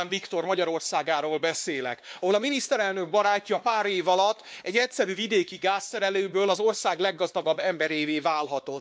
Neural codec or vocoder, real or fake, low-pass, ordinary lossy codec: codec, 16 kHz, 2 kbps, X-Codec, WavLM features, trained on Multilingual LibriSpeech; fake; none; none